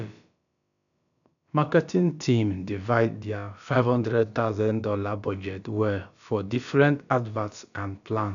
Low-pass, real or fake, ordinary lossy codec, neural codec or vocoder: 7.2 kHz; fake; none; codec, 16 kHz, about 1 kbps, DyCAST, with the encoder's durations